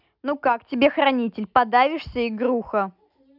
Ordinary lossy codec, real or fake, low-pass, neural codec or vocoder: none; real; 5.4 kHz; none